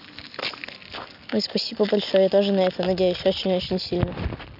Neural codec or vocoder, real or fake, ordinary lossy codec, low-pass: none; real; none; 5.4 kHz